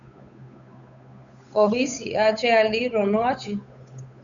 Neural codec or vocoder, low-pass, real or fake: codec, 16 kHz, 8 kbps, FunCodec, trained on Chinese and English, 25 frames a second; 7.2 kHz; fake